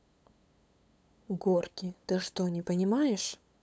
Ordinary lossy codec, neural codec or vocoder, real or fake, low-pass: none; codec, 16 kHz, 8 kbps, FunCodec, trained on LibriTTS, 25 frames a second; fake; none